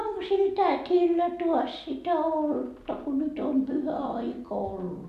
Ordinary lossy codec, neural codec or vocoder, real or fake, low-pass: none; none; real; 14.4 kHz